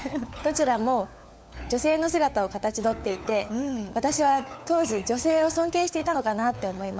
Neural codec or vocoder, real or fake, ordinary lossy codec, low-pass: codec, 16 kHz, 4 kbps, FunCodec, trained on LibriTTS, 50 frames a second; fake; none; none